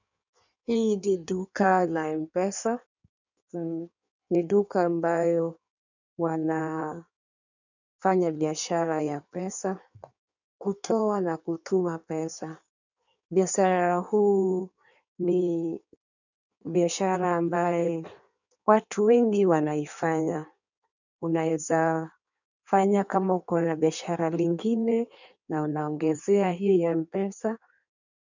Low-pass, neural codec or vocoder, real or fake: 7.2 kHz; codec, 16 kHz in and 24 kHz out, 1.1 kbps, FireRedTTS-2 codec; fake